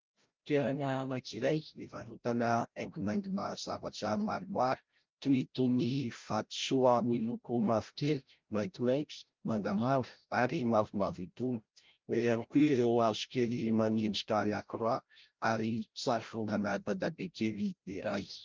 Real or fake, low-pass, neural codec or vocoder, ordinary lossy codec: fake; 7.2 kHz; codec, 16 kHz, 0.5 kbps, FreqCodec, larger model; Opus, 32 kbps